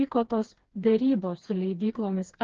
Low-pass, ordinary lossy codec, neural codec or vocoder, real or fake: 7.2 kHz; Opus, 16 kbps; codec, 16 kHz, 2 kbps, FreqCodec, smaller model; fake